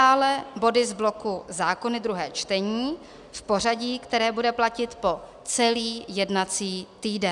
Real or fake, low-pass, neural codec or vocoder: real; 10.8 kHz; none